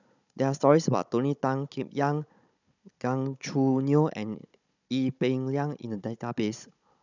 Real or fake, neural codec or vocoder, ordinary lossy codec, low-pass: fake; codec, 16 kHz, 16 kbps, FunCodec, trained on Chinese and English, 50 frames a second; none; 7.2 kHz